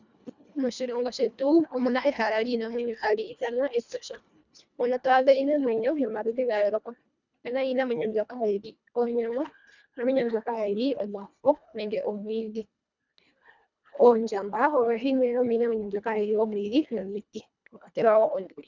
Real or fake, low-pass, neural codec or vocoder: fake; 7.2 kHz; codec, 24 kHz, 1.5 kbps, HILCodec